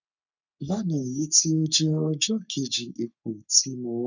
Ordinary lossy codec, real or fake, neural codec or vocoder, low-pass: none; fake; codec, 44.1 kHz, 3.4 kbps, Pupu-Codec; 7.2 kHz